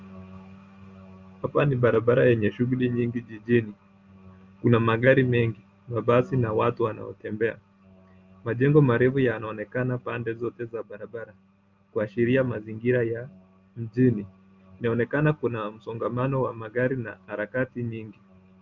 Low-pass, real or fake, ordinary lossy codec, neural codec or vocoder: 7.2 kHz; real; Opus, 32 kbps; none